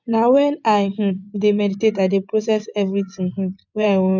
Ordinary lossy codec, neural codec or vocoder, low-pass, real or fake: none; vocoder, 24 kHz, 100 mel bands, Vocos; 7.2 kHz; fake